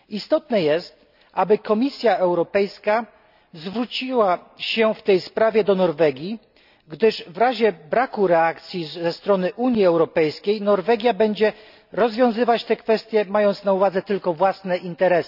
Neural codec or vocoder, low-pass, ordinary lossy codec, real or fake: none; 5.4 kHz; none; real